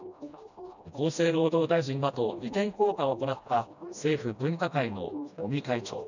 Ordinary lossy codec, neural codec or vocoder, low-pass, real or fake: none; codec, 16 kHz, 1 kbps, FreqCodec, smaller model; 7.2 kHz; fake